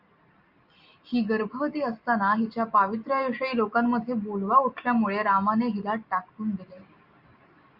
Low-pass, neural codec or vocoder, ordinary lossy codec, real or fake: 5.4 kHz; none; Opus, 64 kbps; real